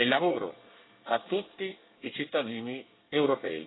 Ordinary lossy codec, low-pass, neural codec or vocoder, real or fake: AAC, 16 kbps; 7.2 kHz; codec, 24 kHz, 1 kbps, SNAC; fake